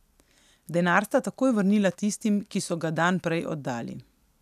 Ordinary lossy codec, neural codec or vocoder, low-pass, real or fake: none; none; 14.4 kHz; real